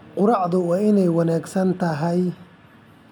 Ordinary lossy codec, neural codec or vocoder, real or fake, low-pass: none; none; real; 19.8 kHz